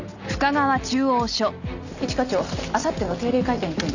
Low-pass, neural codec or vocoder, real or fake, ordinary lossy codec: 7.2 kHz; none; real; none